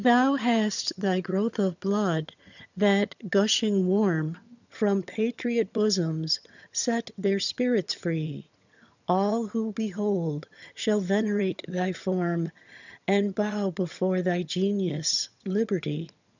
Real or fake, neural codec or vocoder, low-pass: fake; vocoder, 22.05 kHz, 80 mel bands, HiFi-GAN; 7.2 kHz